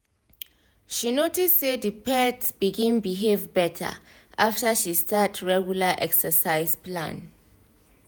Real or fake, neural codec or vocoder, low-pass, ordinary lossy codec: fake; vocoder, 48 kHz, 128 mel bands, Vocos; none; none